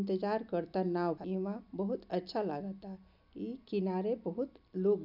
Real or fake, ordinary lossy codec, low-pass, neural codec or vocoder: real; none; 5.4 kHz; none